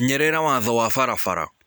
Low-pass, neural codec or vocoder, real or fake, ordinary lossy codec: none; none; real; none